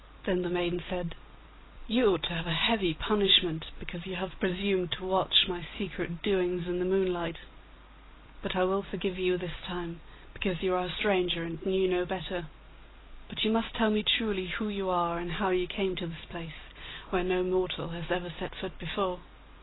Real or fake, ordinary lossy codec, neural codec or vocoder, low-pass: real; AAC, 16 kbps; none; 7.2 kHz